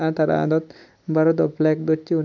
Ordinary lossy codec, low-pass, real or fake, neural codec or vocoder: none; 7.2 kHz; real; none